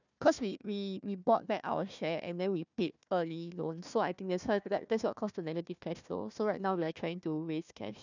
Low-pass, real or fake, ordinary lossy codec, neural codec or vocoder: 7.2 kHz; fake; none; codec, 16 kHz, 1 kbps, FunCodec, trained on Chinese and English, 50 frames a second